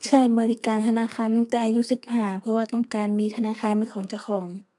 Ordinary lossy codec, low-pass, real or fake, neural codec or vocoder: none; 10.8 kHz; fake; codec, 32 kHz, 1.9 kbps, SNAC